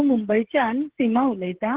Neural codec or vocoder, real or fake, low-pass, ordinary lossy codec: codec, 16 kHz, 16 kbps, FreqCodec, smaller model; fake; 3.6 kHz; Opus, 16 kbps